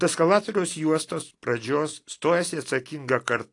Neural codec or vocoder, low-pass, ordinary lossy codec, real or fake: none; 10.8 kHz; AAC, 48 kbps; real